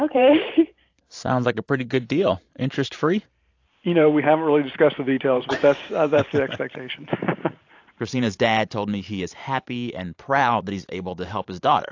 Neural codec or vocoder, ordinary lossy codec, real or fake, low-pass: vocoder, 44.1 kHz, 128 mel bands every 512 samples, BigVGAN v2; AAC, 48 kbps; fake; 7.2 kHz